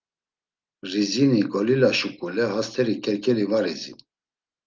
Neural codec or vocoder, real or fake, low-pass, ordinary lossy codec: none; real; 7.2 kHz; Opus, 24 kbps